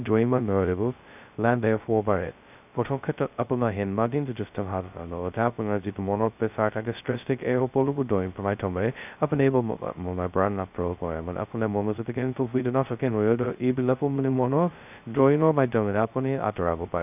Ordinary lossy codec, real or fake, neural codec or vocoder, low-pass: none; fake; codec, 16 kHz, 0.2 kbps, FocalCodec; 3.6 kHz